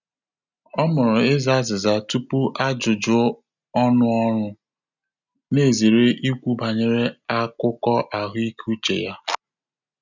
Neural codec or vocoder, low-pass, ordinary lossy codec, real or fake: none; 7.2 kHz; none; real